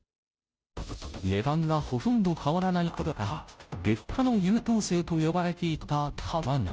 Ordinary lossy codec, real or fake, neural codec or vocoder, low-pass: none; fake; codec, 16 kHz, 0.5 kbps, FunCodec, trained on Chinese and English, 25 frames a second; none